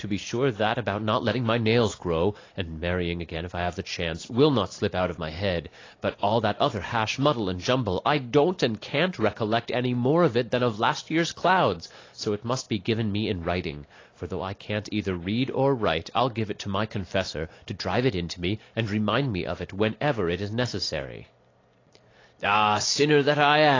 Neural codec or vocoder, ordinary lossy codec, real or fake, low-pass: none; AAC, 32 kbps; real; 7.2 kHz